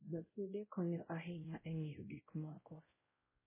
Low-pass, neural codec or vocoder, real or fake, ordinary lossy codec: 3.6 kHz; codec, 16 kHz, 0.5 kbps, X-Codec, WavLM features, trained on Multilingual LibriSpeech; fake; MP3, 16 kbps